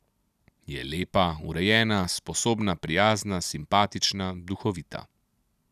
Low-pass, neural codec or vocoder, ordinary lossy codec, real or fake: 14.4 kHz; none; none; real